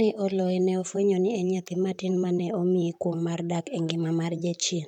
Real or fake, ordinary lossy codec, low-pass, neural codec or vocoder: fake; none; 19.8 kHz; vocoder, 44.1 kHz, 128 mel bands, Pupu-Vocoder